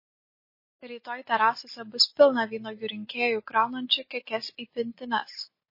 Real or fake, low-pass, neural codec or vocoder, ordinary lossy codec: real; 5.4 kHz; none; MP3, 24 kbps